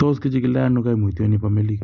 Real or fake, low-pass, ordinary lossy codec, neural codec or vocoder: real; 7.2 kHz; Opus, 64 kbps; none